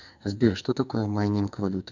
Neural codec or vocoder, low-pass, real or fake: codec, 44.1 kHz, 2.6 kbps, SNAC; 7.2 kHz; fake